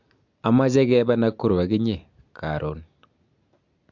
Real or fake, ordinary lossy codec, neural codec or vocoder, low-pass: real; MP3, 64 kbps; none; 7.2 kHz